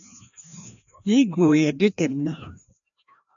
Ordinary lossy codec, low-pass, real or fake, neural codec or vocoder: MP3, 64 kbps; 7.2 kHz; fake; codec, 16 kHz, 1 kbps, FreqCodec, larger model